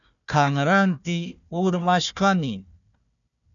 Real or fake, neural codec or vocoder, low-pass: fake; codec, 16 kHz, 1 kbps, FunCodec, trained on Chinese and English, 50 frames a second; 7.2 kHz